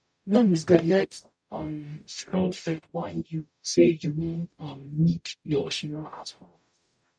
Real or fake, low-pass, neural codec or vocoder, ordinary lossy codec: fake; 9.9 kHz; codec, 44.1 kHz, 0.9 kbps, DAC; MP3, 96 kbps